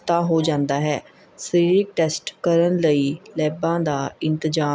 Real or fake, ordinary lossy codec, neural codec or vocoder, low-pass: real; none; none; none